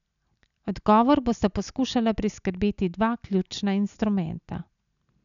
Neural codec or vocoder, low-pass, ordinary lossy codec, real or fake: none; 7.2 kHz; none; real